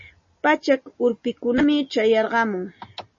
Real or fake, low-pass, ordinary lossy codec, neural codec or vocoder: real; 7.2 kHz; MP3, 32 kbps; none